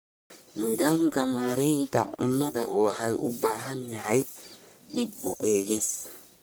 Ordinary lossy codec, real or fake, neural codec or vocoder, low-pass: none; fake; codec, 44.1 kHz, 1.7 kbps, Pupu-Codec; none